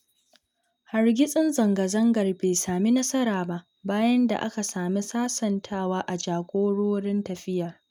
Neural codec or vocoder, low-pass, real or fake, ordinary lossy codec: none; 19.8 kHz; real; none